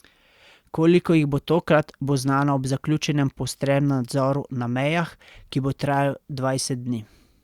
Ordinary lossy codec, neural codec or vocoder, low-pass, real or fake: Opus, 64 kbps; none; 19.8 kHz; real